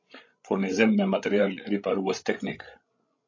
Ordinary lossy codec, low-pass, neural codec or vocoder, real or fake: MP3, 48 kbps; 7.2 kHz; codec, 16 kHz, 16 kbps, FreqCodec, larger model; fake